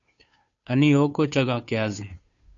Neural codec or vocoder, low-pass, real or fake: codec, 16 kHz, 2 kbps, FunCodec, trained on Chinese and English, 25 frames a second; 7.2 kHz; fake